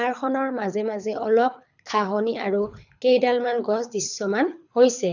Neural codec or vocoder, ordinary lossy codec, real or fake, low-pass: codec, 24 kHz, 6 kbps, HILCodec; none; fake; 7.2 kHz